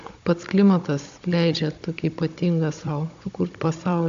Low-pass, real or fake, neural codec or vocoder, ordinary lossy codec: 7.2 kHz; fake; codec, 16 kHz, 16 kbps, FunCodec, trained on Chinese and English, 50 frames a second; AAC, 64 kbps